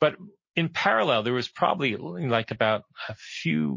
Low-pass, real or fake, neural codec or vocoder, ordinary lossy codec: 7.2 kHz; real; none; MP3, 32 kbps